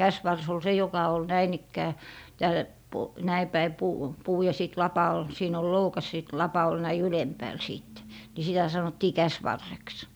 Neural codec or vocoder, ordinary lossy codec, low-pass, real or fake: none; none; none; real